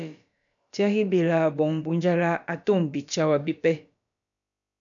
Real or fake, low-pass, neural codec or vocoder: fake; 7.2 kHz; codec, 16 kHz, about 1 kbps, DyCAST, with the encoder's durations